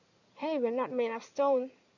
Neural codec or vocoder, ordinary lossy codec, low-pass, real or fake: codec, 16 kHz in and 24 kHz out, 2.2 kbps, FireRedTTS-2 codec; none; 7.2 kHz; fake